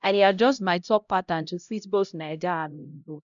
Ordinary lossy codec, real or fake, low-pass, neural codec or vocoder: none; fake; 7.2 kHz; codec, 16 kHz, 0.5 kbps, X-Codec, HuBERT features, trained on LibriSpeech